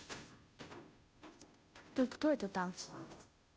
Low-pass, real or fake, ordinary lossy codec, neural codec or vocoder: none; fake; none; codec, 16 kHz, 0.5 kbps, FunCodec, trained on Chinese and English, 25 frames a second